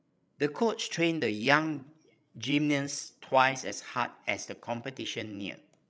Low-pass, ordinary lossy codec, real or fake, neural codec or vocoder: none; none; fake; codec, 16 kHz, 16 kbps, FreqCodec, larger model